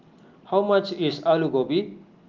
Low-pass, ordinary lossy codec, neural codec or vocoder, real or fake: 7.2 kHz; Opus, 32 kbps; none; real